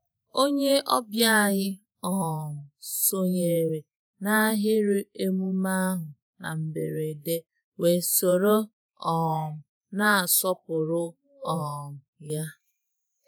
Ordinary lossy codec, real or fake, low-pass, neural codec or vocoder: none; fake; none; vocoder, 48 kHz, 128 mel bands, Vocos